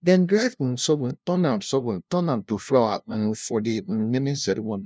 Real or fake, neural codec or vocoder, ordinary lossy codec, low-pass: fake; codec, 16 kHz, 0.5 kbps, FunCodec, trained on LibriTTS, 25 frames a second; none; none